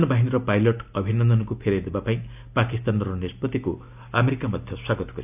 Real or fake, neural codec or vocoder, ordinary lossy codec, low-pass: real; none; none; 3.6 kHz